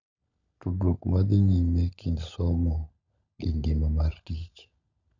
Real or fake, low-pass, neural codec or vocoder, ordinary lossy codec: fake; 7.2 kHz; codec, 16 kHz, 16 kbps, FunCodec, trained on LibriTTS, 50 frames a second; none